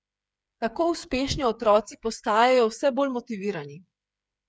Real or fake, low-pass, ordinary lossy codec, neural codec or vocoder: fake; none; none; codec, 16 kHz, 8 kbps, FreqCodec, smaller model